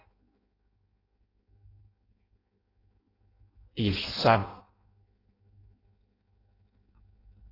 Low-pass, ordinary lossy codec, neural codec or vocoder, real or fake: 5.4 kHz; AAC, 24 kbps; codec, 16 kHz in and 24 kHz out, 0.6 kbps, FireRedTTS-2 codec; fake